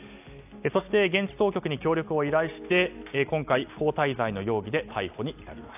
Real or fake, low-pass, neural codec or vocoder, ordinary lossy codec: real; 3.6 kHz; none; AAC, 32 kbps